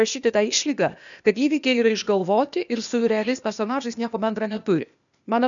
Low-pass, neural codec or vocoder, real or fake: 7.2 kHz; codec, 16 kHz, 0.8 kbps, ZipCodec; fake